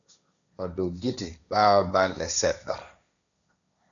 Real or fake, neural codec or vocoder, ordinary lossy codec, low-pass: fake; codec, 16 kHz, 1.1 kbps, Voila-Tokenizer; MP3, 96 kbps; 7.2 kHz